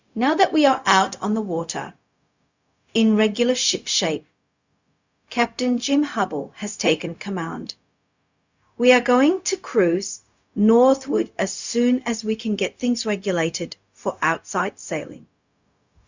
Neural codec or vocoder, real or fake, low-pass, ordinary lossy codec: codec, 16 kHz, 0.4 kbps, LongCat-Audio-Codec; fake; 7.2 kHz; Opus, 64 kbps